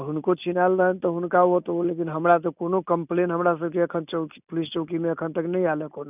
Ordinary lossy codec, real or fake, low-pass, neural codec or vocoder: none; real; 3.6 kHz; none